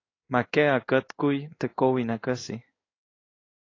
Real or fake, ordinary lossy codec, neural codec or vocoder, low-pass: fake; AAC, 32 kbps; codec, 16 kHz in and 24 kHz out, 1 kbps, XY-Tokenizer; 7.2 kHz